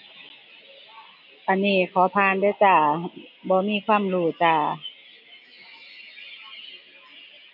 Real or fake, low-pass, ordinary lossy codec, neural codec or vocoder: real; 5.4 kHz; none; none